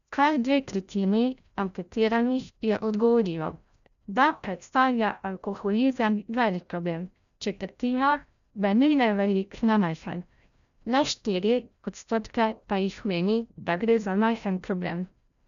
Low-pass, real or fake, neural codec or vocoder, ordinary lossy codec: 7.2 kHz; fake; codec, 16 kHz, 0.5 kbps, FreqCodec, larger model; none